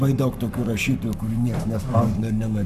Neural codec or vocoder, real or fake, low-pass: codec, 44.1 kHz, 7.8 kbps, Pupu-Codec; fake; 14.4 kHz